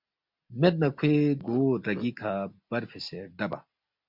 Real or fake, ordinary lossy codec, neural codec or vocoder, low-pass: real; MP3, 48 kbps; none; 5.4 kHz